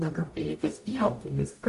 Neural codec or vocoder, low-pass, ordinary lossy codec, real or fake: codec, 44.1 kHz, 0.9 kbps, DAC; 14.4 kHz; MP3, 48 kbps; fake